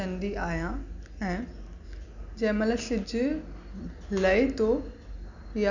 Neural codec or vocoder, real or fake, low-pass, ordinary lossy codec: none; real; 7.2 kHz; none